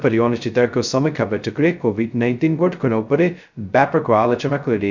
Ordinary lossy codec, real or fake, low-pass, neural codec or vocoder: none; fake; 7.2 kHz; codec, 16 kHz, 0.2 kbps, FocalCodec